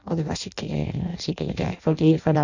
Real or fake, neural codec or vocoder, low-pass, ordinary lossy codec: fake; codec, 16 kHz in and 24 kHz out, 0.6 kbps, FireRedTTS-2 codec; 7.2 kHz; none